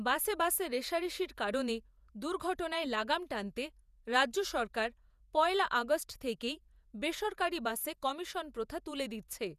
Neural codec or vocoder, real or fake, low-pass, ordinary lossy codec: vocoder, 48 kHz, 128 mel bands, Vocos; fake; 14.4 kHz; none